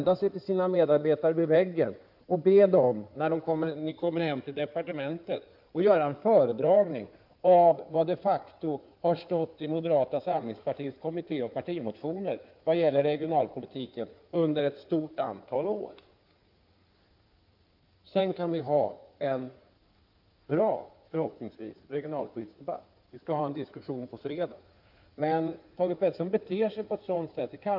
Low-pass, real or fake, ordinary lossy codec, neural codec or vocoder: 5.4 kHz; fake; none; codec, 16 kHz in and 24 kHz out, 2.2 kbps, FireRedTTS-2 codec